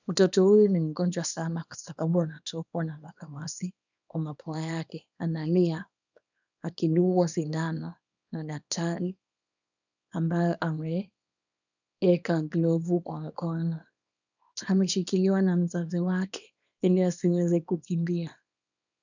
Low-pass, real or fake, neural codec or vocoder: 7.2 kHz; fake; codec, 24 kHz, 0.9 kbps, WavTokenizer, small release